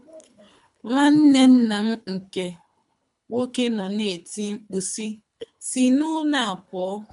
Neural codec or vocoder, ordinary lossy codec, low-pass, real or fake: codec, 24 kHz, 3 kbps, HILCodec; none; 10.8 kHz; fake